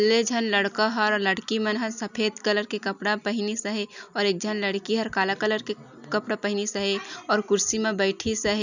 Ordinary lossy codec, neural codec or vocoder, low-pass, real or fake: none; none; 7.2 kHz; real